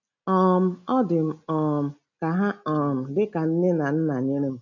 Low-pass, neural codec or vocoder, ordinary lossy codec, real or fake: 7.2 kHz; none; none; real